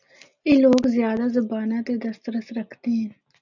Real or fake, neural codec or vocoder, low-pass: real; none; 7.2 kHz